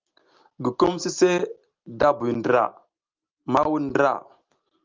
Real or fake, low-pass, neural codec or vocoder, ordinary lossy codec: real; 7.2 kHz; none; Opus, 32 kbps